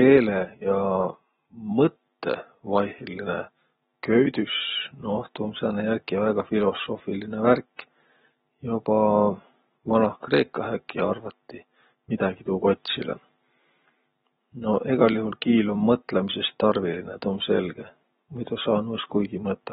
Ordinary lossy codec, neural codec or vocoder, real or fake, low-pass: AAC, 16 kbps; none; real; 19.8 kHz